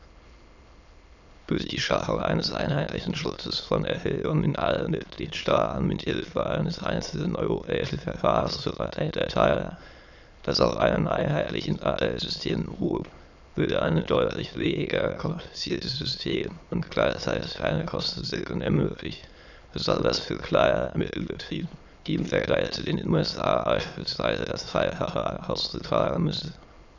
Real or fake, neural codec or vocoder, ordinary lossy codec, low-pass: fake; autoencoder, 22.05 kHz, a latent of 192 numbers a frame, VITS, trained on many speakers; none; 7.2 kHz